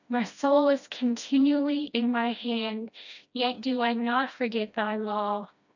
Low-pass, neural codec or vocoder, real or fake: 7.2 kHz; codec, 16 kHz, 1 kbps, FreqCodec, smaller model; fake